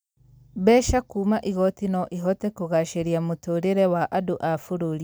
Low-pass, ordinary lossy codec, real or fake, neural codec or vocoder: none; none; real; none